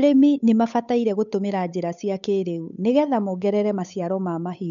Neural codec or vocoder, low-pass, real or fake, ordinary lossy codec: codec, 16 kHz, 8 kbps, FunCodec, trained on Chinese and English, 25 frames a second; 7.2 kHz; fake; none